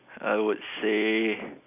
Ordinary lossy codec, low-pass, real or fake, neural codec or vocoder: none; 3.6 kHz; real; none